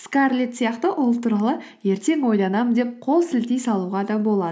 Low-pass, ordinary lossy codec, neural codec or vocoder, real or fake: none; none; none; real